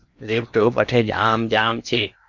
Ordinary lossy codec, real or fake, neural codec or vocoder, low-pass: Opus, 64 kbps; fake; codec, 16 kHz in and 24 kHz out, 0.8 kbps, FocalCodec, streaming, 65536 codes; 7.2 kHz